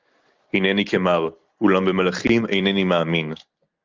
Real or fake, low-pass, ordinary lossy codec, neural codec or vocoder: real; 7.2 kHz; Opus, 32 kbps; none